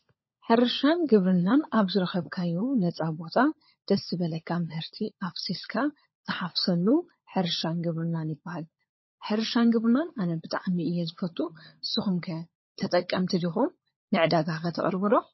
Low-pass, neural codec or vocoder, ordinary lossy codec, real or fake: 7.2 kHz; codec, 16 kHz, 16 kbps, FunCodec, trained on LibriTTS, 50 frames a second; MP3, 24 kbps; fake